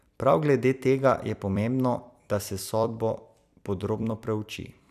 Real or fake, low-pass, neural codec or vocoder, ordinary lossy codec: fake; 14.4 kHz; vocoder, 44.1 kHz, 128 mel bands every 256 samples, BigVGAN v2; none